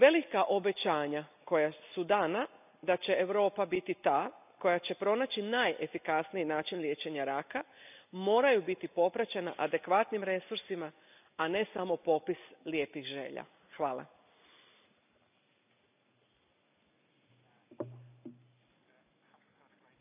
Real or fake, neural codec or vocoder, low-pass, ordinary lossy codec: real; none; 3.6 kHz; none